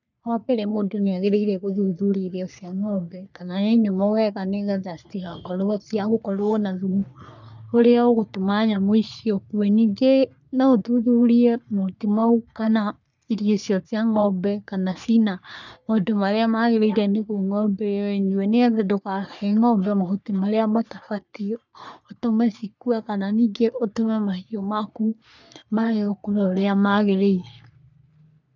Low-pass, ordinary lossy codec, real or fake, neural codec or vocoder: 7.2 kHz; none; fake; codec, 44.1 kHz, 3.4 kbps, Pupu-Codec